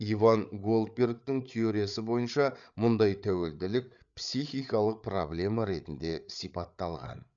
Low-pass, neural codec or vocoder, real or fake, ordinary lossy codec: 7.2 kHz; codec, 16 kHz, 8 kbps, FreqCodec, larger model; fake; none